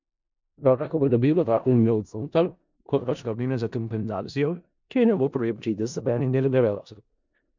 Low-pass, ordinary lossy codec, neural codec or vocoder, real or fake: 7.2 kHz; MP3, 64 kbps; codec, 16 kHz in and 24 kHz out, 0.4 kbps, LongCat-Audio-Codec, four codebook decoder; fake